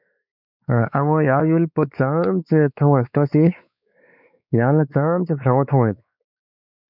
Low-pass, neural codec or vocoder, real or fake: 5.4 kHz; codec, 16 kHz, 4 kbps, X-Codec, WavLM features, trained on Multilingual LibriSpeech; fake